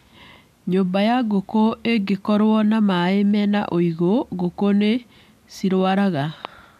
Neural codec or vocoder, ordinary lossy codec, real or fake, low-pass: none; none; real; 14.4 kHz